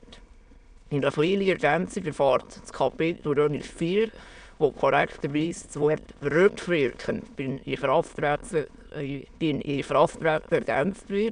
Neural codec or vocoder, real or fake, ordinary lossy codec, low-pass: autoencoder, 22.05 kHz, a latent of 192 numbers a frame, VITS, trained on many speakers; fake; none; 9.9 kHz